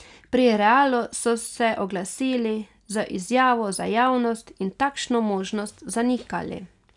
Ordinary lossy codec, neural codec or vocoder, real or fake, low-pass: none; none; real; 10.8 kHz